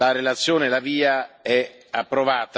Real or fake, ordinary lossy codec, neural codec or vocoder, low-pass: real; none; none; none